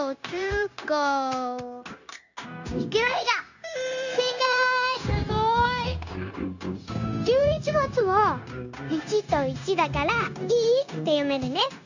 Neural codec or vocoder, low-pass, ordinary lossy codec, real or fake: codec, 16 kHz, 0.9 kbps, LongCat-Audio-Codec; 7.2 kHz; none; fake